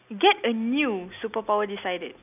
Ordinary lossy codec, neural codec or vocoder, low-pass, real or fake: none; none; 3.6 kHz; real